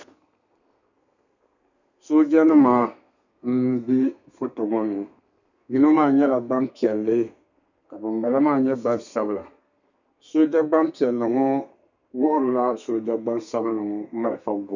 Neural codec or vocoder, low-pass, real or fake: codec, 32 kHz, 1.9 kbps, SNAC; 7.2 kHz; fake